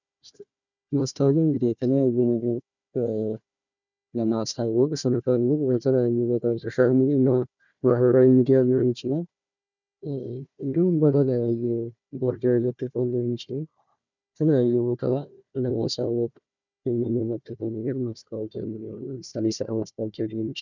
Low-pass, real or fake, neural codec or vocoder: 7.2 kHz; fake; codec, 16 kHz, 1 kbps, FunCodec, trained on Chinese and English, 50 frames a second